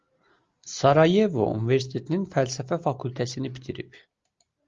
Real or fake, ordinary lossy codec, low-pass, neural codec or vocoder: real; Opus, 32 kbps; 7.2 kHz; none